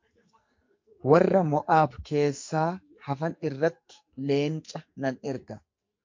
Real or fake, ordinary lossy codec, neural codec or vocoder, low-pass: fake; MP3, 48 kbps; codec, 44.1 kHz, 2.6 kbps, SNAC; 7.2 kHz